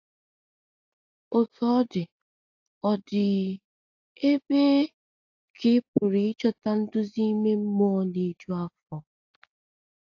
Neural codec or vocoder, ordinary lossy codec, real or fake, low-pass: none; AAC, 48 kbps; real; 7.2 kHz